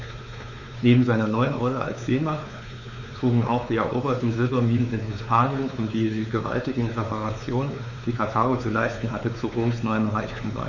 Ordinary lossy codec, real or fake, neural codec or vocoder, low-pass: none; fake; codec, 16 kHz, 4 kbps, X-Codec, WavLM features, trained on Multilingual LibriSpeech; 7.2 kHz